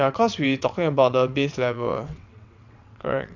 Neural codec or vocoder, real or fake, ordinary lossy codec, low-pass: vocoder, 22.05 kHz, 80 mel bands, WaveNeXt; fake; none; 7.2 kHz